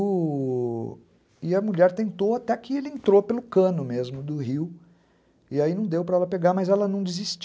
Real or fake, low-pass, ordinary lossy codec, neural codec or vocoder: real; none; none; none